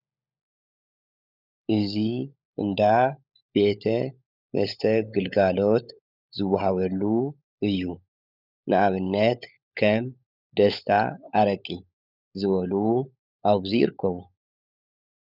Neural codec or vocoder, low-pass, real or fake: codec, 16 kHz, 16 kbps, FunCodec, trained on LibriTTS, 50 frames a second; 5.4 kHz; fake